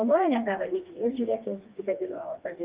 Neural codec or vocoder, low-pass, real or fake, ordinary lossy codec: codec, 16 kHz, 2 kbps, FreqCodec, smaller model; 3.6 kHz; fake; Opus, 32 kbps